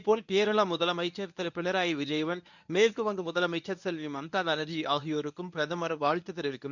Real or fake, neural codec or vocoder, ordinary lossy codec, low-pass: fake; codec, 24 kHz, 0.9 kbps, WavTokenizer, medium speech release version 1; none; 7.2 kHz